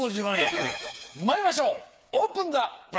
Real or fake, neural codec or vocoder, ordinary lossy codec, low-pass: fake; codec, 16 kHz, 4 kbps, FreqCodec, smaller model; none; none